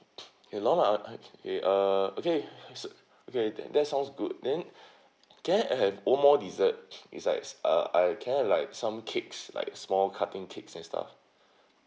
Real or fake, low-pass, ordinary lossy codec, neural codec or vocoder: real; none; none; none